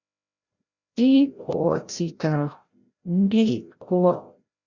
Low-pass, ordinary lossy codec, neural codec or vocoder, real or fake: 7.2 kHz; Opus, 64 kbps; codec, 16 kHz, 0.5 kbps, FreqCodec, larger model; fake